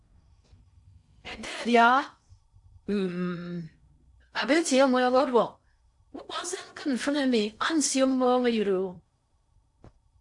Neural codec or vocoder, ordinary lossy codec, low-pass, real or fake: codec, 16 kHz in and 24 kHz out, 0.6 kbps, FocalCodec, streaming, 4096 codes; AAC, 64 kbps; 10.8 kHz; fake